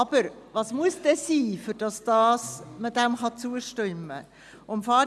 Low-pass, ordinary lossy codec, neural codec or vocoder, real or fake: none; none; none; real